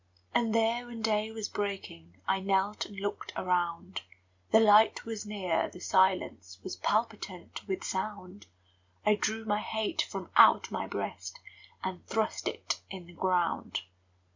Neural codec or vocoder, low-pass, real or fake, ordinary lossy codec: none; 7.2 kHz; real; MP3, 64 kbps